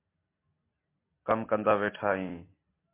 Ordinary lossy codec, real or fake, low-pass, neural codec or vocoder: MP3, 24 kbps; real; 3.6 kHz; none